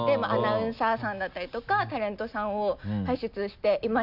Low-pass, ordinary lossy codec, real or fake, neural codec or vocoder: 5.4 kHz; none; real; none